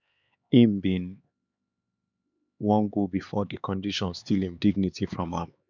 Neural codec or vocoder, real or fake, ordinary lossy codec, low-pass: codec, 16 kHz, 4 kbps, X-Codec, HuBERT features, trained on LibriSpeech; fake; none; 7.2 kHz